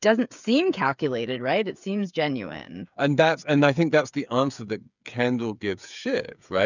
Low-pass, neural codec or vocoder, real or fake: 7.2 kHz; codec, 16 kHz, 8 kbps, FreqCodec, smaller model; fake